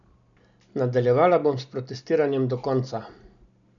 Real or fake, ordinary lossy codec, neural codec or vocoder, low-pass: real; none; none; 7.2 kHz